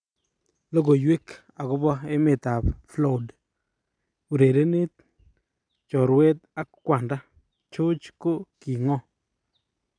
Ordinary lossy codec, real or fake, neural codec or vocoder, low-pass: none; real; none; 9.9 kHz